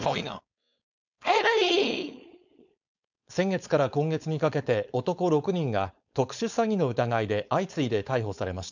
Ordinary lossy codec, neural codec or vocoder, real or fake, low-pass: none; codec, 16 kHz, 4.8 kbps, FACodec; fake; 7.2 kHz